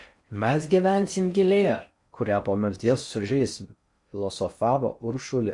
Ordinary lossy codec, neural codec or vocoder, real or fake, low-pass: MP3, 64 kbps; codec, 16 kHz in and 24 kHz out, 0.6 kbps, FocalCodec, streaming, 4096 codes; fake; 10.8 kHz